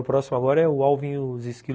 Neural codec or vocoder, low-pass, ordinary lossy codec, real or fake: none; none; none; real